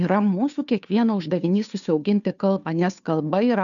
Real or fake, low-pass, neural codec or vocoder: fake; 7.2 kHz; codec, 16 kHz, 2 kbps, FunCodec, trained on Chinese and English, 25 frames a second